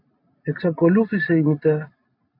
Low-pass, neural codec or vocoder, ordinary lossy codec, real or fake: 5.4 kHz; none; AAC, 32 kbps; real